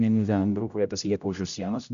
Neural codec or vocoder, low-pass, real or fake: codec, 16 kHz, 0.5 kbps, X-Codec, HuBERT features, trained on general audio; 7.2 kHz; fake